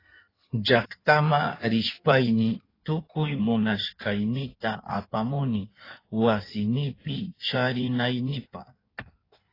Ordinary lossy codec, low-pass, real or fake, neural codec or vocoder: AAC, 24 kbps; 5.4 kHz; fake; codec, 16 kHz in and 24 kHz out, 1.1 kbps, FireRedTTS-2 codec